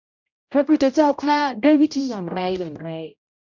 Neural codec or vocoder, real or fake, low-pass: codec, 16 kHz, 0.5 kbps, X-Codec, HuBERT features, trained on general audio; fake; 7.2 kHz